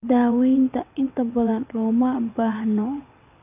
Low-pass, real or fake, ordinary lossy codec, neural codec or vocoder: 3.6 kHz; fake; none; vocoder, 22.05 kHz, 80 mel bands, WaveNeXt